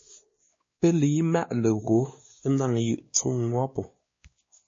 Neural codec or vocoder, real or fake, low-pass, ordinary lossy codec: codec, 16 kHz, 2 kbps, X-Codec, WavLM features, trained on Multilingual LibriSpeech; fake; 7.2 kHz; MP3, 32 kbps